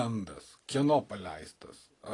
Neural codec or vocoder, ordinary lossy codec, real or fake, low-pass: none; AAC, 32 kbps; real; 10.8 kHz